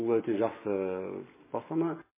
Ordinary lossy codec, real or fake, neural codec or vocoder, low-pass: MP3, 16 kbps; real; none; 3.6 kHz